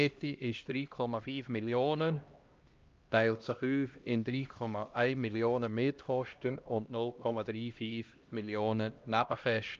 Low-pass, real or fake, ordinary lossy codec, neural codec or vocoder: 7.2 kHz; fake; Opus, 24 kbps; codec, 16 kHz, 1 kbps, X-Codec, HuBERT features, trained on LibriSpeech